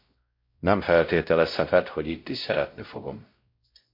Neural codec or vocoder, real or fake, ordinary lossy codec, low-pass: codec, 16 kHz, 0.5 kbps, X-Codec, WavLM features, trained on Multilingual LibriSpeech; fake; MP3, 32 kbps; 5.4 kHz